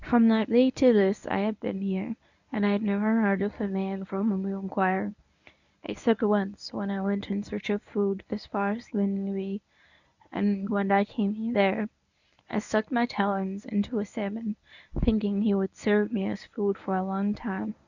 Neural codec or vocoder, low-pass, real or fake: codec, 24 kHz, 0.9 kbps, WavTokenizer, medium speech release version 1; 7.2 kHz; fake